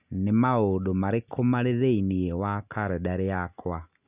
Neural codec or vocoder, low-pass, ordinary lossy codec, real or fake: none; 3.6 kHz; none; real